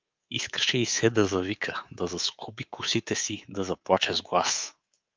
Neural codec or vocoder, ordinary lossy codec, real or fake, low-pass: codec, 24 kHz, 3.1 kbps, DualCodec; Opus, 24 kbps; fake; 7.2 kHz